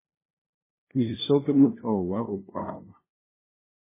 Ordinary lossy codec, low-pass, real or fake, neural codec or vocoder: MP3, 16 kbps; 3.6 kHz; fake; codec, 16 kHz, 0.5 kbps, FunCodec, trained on LibriTTS, 25 frames a second